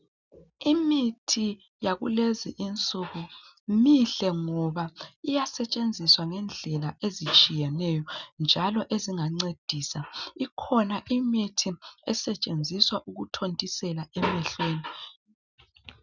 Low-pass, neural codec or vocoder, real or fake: 7.2 kHz; none; real